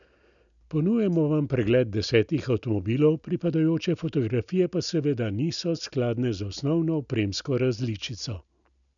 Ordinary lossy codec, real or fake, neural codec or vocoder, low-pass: none; real; none; 7.2 kHz